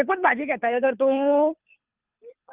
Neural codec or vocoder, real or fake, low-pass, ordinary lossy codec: codec, 24 kHz, 3 kbps, HILCodec; fake; 3.6 kHz; Opus, 24 kbps